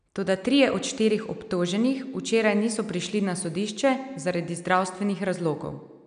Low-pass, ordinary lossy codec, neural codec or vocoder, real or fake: 9.9 kHz; none; none; real